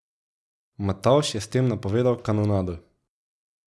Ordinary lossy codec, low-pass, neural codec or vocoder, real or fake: none; none; none; real